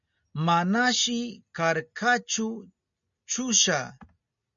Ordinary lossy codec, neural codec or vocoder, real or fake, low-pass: MP3, 96 kbps; none; real; 7.2 kHz